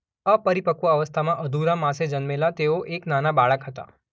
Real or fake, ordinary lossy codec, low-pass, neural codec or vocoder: real; none; none; none